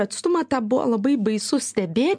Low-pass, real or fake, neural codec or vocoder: 9.9 kHz; real; none